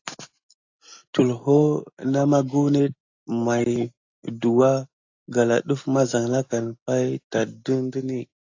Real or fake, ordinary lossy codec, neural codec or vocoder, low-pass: real; AAC, 48 kbps; none; 7.2 kHz